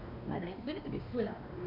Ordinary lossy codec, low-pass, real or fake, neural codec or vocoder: AAC, 32 kbps; 5.4 kHz; fake; codec, 16 kHz, 2 kbps, X-Codec, WavLM features, trained on Multilingual LibriSpeech